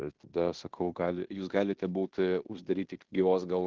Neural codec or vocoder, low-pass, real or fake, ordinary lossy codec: codec, 16 kHz in and 24 kHz out, 0.9 kbps, LongCat-Audio-Codec, fine tuned four codebook decoder; 7.2 kHz; fake; Opus, 16 kbps